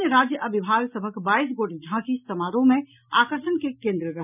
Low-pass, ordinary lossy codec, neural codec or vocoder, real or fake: 3.6 kHz; none; none; real